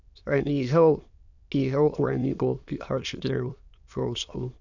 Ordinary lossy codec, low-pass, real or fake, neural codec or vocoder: none; 7.2 kHz; fake; autoencoder, 22.05 kHz, a latent of 192 numbers a frame, VITS, trained on many speakers